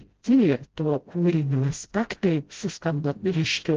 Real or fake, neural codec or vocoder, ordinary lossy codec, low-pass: fake; codec, 16 kHz, 0.5 kbps, FreqCodec, smaller model; Opus, 16 kbps; 7.2 kHz